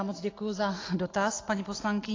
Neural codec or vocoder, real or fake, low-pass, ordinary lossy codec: none; real; 7.2 kHz; AAC, 32 kbps